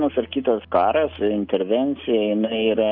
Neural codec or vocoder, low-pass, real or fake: none; 5.4 kHz; real